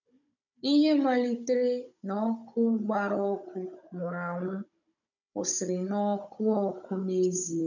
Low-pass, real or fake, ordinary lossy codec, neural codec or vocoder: 7.2 kHz; fake; none; codec, 16 kHz, 16 kbps, FunCodec, trained on Chinese and English, 50 frames a second